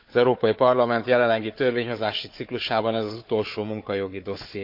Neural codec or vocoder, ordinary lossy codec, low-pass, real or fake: codec, 16 kHz, 4 kbps, FunCodec, trained on Chinese and English, 50 frames a second; MP3, 48 kbps; 5.4 kHz; fake